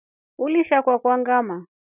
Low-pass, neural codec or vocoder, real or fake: 3.6 kHz; none; real